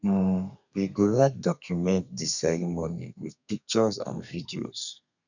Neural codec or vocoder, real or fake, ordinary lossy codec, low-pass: codec, 32 kHz, 1.9 kbps, SNAC; fake; none; 7.2 kHz